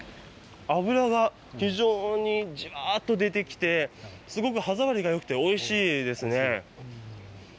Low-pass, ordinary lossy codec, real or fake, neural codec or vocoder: none; none; real; none